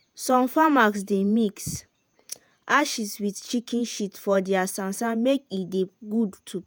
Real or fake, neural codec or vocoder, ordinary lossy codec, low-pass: fake; vocoder, 48 kHz, 128 mel bands, Vocos; none; none